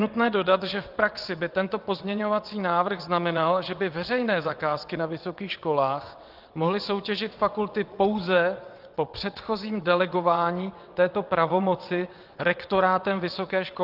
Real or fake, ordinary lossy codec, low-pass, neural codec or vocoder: fake; Opus, 32 kbps; 5.4 kHz; vocoder, 22.05 kHz, 80 mel bands, WaveNeXt